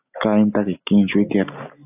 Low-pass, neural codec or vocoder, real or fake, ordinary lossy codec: 3.6 kHz; none; real; AAC, 32 kbps